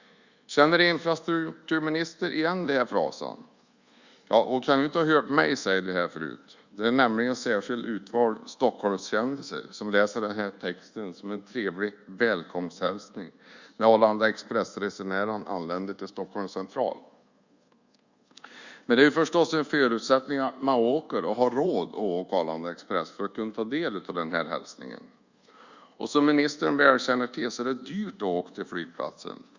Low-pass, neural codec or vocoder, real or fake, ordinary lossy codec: 7.2 kHz; codec, 24 kHz, 1.2 kbps, DualCodec; fake; Opus, 64 kbps